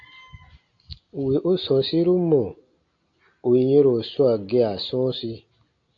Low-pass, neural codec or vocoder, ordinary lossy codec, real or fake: 7.2 kHz; none; AAC, 48 kbps; real